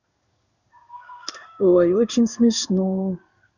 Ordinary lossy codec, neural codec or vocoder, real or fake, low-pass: none; codec, 16 kHz in and 24 kHz out, 1 kbps, XY-Tokenizer; fake; 7.2 kHz